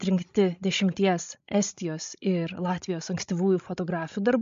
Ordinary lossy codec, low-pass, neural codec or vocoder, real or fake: MP3, 48 kbps; 7.2 kHz; codec, 16 kHz, 16 kbps, FreqCodec, larger model; fake